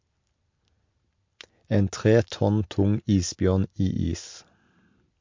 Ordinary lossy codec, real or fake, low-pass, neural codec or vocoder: MP3, 48 kbps; real; 7.2 kHz; none